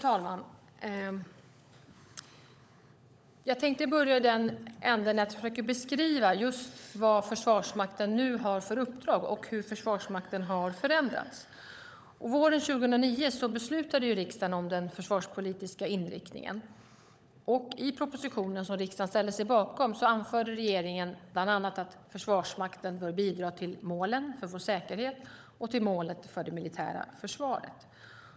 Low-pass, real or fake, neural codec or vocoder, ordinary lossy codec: none; fake; codec, 16 kHz, 16 kbps, FunCodec, trained on LibriTTS, 50 frames a second; none